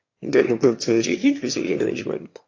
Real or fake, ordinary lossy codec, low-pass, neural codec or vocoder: fake; AAC, 48 kbps; 7.2 kHz; autoencoder, 22.05 kHz, a latent of 192 numbers a frame, VITS, trained on one speaker